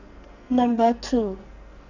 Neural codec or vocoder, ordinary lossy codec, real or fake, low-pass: codec, 44.1 kHz, 2.6 kbps, SNAC; none; fake; 7.2 kHz